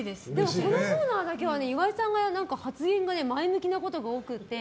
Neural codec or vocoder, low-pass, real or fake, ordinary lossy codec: none; none; real; none